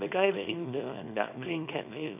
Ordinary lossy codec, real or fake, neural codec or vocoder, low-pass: none; fake; codec, 24 kHz, 0.9 kbps, WavTokenizer, small release; 3.6 kHz